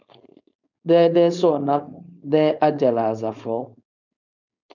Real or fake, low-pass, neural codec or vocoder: fake; 7.2 kHz; codec, 16 kHz, 4.8 kbps, FACodec